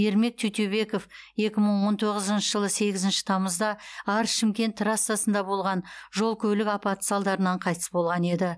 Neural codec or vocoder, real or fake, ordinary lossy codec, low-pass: none; real; none; none